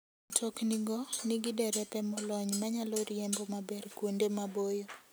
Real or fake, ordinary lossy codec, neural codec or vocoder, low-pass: real; none; none; none